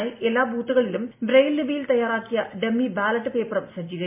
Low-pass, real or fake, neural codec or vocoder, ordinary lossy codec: 3.6 kHz; real; none; MP3, 24 kbps